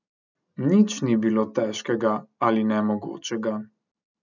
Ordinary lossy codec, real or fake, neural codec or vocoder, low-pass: none; real; none; 7.2 kHz